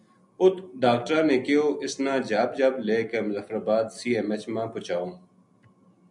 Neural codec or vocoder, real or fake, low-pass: none; real; 10.8 kHz